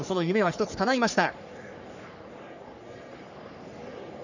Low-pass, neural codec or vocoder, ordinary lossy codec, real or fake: 7.2 kHz; codec, 44.1 kHz, 3.4 kbps, Pupu-Codec; none; fake